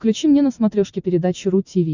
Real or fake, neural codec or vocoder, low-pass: real; none; 7.2 kHz